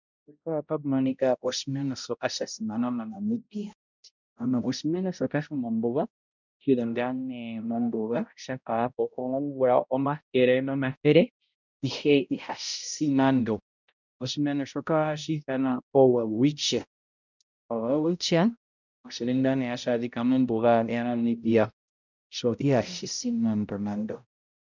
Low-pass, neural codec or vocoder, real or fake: 7.2 kHz; codec, 16 kHz, 0.5 kbps, X-Codec, HuBERT features, trained on balanced general audio; fake